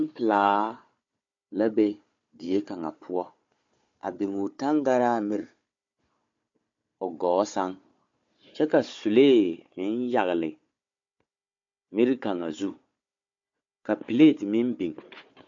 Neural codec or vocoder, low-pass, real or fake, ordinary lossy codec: codec, 16 kHz, 4 kbps, FunCodec, trained on Chinese and English, 50 frames a second; 7.2 kHz; fake; MP3, 48 kbps